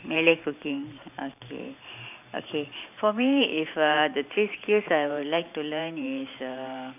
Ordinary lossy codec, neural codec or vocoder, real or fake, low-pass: none; vocoder, 44.1 kHz, 128 mel bands every 512 samples, BigVGAN v2; fake; 3.6 kHz